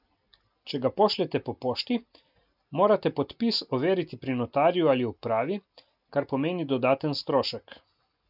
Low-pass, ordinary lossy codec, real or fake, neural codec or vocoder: 5.4 kHz; none; real; none